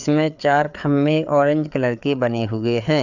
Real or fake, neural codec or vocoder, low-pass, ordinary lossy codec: fake; codec, 16 kHz, 4 kbps, FreqCodec, larger model; 7.2 kHz; none